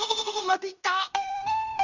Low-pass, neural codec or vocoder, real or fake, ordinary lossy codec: 7.2 kHz; codec, 16 kHz in and 24 kHz out, 0.9 kbps, LongCat-Audio-Codec, fine tuned four codebook decoder; fake; none